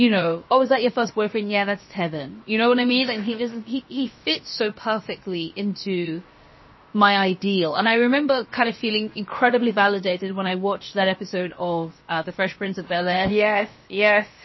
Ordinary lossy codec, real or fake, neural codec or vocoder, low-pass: MP3, 24 kbps; fake; codec, 16 kHz, 0.7 kbps, FocalCodec; 7.2 kHz